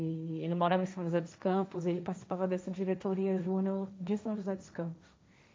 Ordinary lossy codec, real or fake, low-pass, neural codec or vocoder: none; fake; none; codec, 16 kHz, 1.1 kbps, Voila-Tokenizer